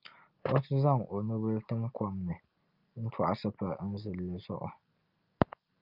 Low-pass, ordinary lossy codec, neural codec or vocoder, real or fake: 5.4 kHz; Opus, 24 kbps; none; real